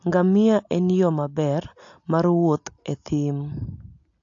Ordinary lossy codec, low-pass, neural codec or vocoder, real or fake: AAC, 48 kbps; 7.2 kHz; none; real